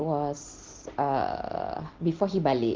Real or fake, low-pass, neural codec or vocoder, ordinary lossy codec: real; 7.2 kHz; none; Opus, 16 kbps